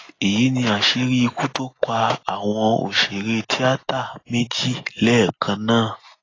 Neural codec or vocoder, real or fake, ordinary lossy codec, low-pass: none; real; AAC, 32 kbps; 7.2 kHz